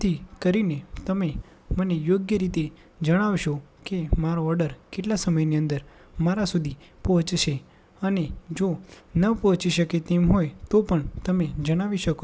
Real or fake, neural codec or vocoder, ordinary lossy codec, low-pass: real; none; none; none